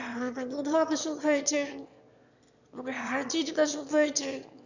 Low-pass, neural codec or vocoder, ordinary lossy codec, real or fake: 7.2 kHz; autoencoder, 22.05 kHz, a latent of 192 numbers a frame, VITS, trained on one speaker; none; fake